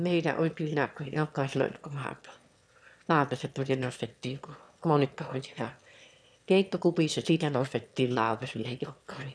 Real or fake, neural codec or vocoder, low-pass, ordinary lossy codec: fake; autoencoder, 22.05 kHz, a latent of 192 numbers a frame, VITS, trained on one speaker; none; none